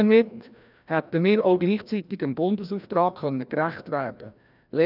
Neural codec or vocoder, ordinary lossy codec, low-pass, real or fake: codec, 16 kHz, 1 kbps, FreqCodec, larger model; none; 5.4 kHz; fake